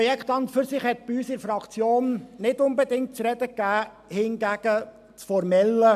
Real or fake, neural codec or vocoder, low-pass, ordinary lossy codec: real; none; 14.4 kHz; AAC, 96 kbps